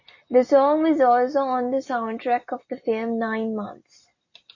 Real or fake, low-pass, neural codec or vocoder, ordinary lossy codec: real; 7.2 kHz; none; MP3, 32 kbps